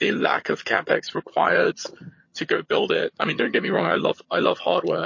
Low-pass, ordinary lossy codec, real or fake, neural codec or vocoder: 7.2 kHz; MP3, 32 kbps; fake; vocoder, 22.05 kHz, 80 mel bands, HiFi-GAN